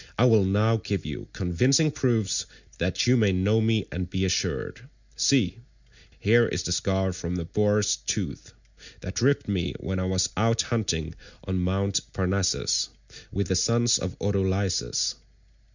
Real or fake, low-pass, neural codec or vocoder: real; 7.2 kHz; none